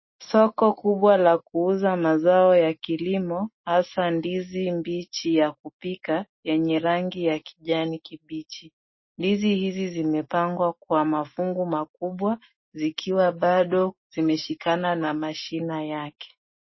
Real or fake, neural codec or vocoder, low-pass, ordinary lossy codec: real; none; 7.2 kHz; MP3, 24 kbps